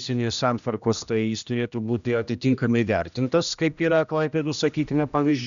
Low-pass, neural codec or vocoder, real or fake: 7.2 kHz; codec, 16 kHz, 1 kbps, X-Codec, HuBERT features, trained on general audio; fake